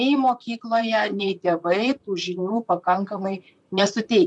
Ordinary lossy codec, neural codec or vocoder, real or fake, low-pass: MP3, 96 kbps; vocoder, 44.1 kHz, 128 mel bands, Pupu-Vocoder; fake; 10.8 kHz